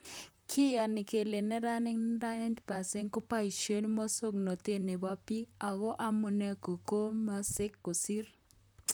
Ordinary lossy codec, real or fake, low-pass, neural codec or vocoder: none; fake; none; vocoder, 44.1 kHz, 128 mel bands, Pupu-Vocoder